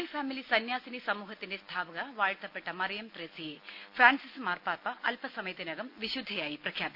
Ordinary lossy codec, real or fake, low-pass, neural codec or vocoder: none; real; 5.4 kHz; none